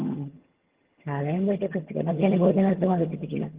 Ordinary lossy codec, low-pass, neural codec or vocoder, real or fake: Opus, 16 kbps; 3.6 kHz; vocoder, 22.05 kHz, 80 mel bands, HiFi-GAN; fake